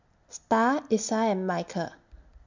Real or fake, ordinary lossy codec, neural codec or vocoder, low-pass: real; MP3, 64 kbps; none; 7.2 kHz